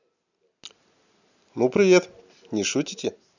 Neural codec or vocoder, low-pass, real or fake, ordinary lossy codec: none; 7.2 kHz; real; none